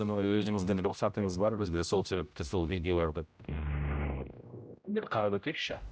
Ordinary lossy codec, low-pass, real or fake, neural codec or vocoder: none; none; fake; codec, 16 kHz, 0.5 kbps, X-Codec, HuBERT features, trained on general audio